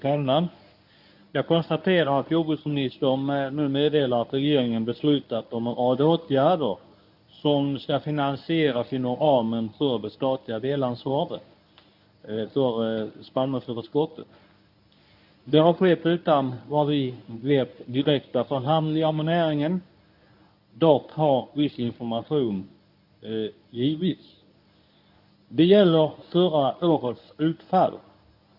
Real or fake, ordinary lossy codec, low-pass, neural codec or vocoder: fake; none; 5.4 kHz; codec, 24 kHz, 0.9 kbps, WavTokenizer, medium speech release version 1